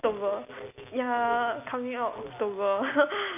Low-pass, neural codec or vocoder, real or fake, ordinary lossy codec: 3.6 kHz; none; real; none